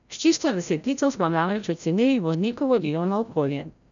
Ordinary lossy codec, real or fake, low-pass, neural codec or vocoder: none; fake; 7.2 kHz; codec, 16 kHz, 0.5 kbps, FreqCodec, larger model